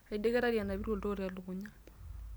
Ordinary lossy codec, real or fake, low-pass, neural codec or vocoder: none; real; none; none